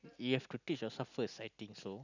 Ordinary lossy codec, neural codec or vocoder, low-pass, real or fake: none; none; 7.2 kHz; real